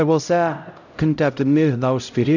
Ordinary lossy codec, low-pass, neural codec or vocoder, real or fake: none; 7.2 kHz; codec, 16 kHz, 0.5 kbps, X-Codec, HuBERT features, trained on LibriSpeech; fake